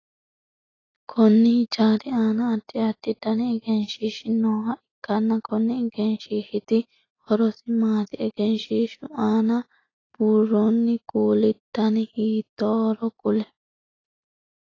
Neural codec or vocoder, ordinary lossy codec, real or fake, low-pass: none; AAC, 32 kbps; real; 7.2 kHz